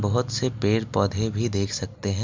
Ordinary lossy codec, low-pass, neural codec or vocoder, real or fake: MP3, 64 kbps; 7.2 kHz; none; real